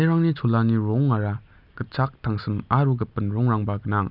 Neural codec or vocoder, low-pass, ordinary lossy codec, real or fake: none; 5.4 kHz; none; real